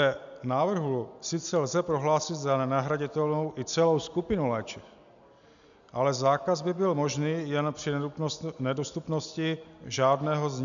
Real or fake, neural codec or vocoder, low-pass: real; none; 7.2 kHz